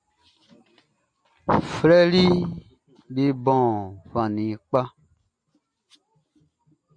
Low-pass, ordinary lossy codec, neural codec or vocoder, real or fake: 9.9 kHz; MP3, 64 kbps; none; real